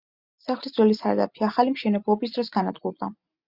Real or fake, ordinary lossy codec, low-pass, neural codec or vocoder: real; Opus, 64 kbps; 5.4 kHz; none